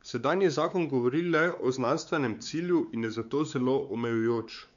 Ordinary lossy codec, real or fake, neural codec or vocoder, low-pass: none; fake; codec, 16 kHz, 4 kbps, X-Codec, WavLM features, trained on Multilingual LibriSpeech; 7.2 kHz